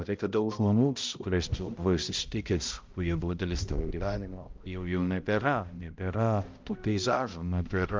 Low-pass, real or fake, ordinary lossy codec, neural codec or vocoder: 7.2 kHz; fake; Opus, 32 kbps; codec, 16 kHz, 0.5 kbps, X-Codec, HuBERT features, trained on balanced general audio